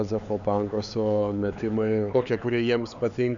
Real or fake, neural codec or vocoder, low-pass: fake; codec, 16 kHz, 4 kbps, X-Codec, HuBERT features, trained on LibriSpeech; 7.2 kHz